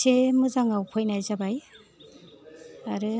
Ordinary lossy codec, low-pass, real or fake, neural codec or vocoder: none; none; real; none